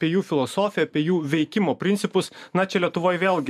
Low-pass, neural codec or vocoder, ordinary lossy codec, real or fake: 14.4 kHz; vocoder, 44.1 kHz, 128 mel bands every 512 samples, BigVGAN v2; MP3, 96 kbps; fake